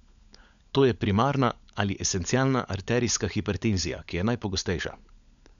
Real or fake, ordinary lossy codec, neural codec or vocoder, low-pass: fake; none; codec, 16 kHz, 16 kbps, FunCodec, trained on LibriTTS, 50 frames a second; 7.2 kHz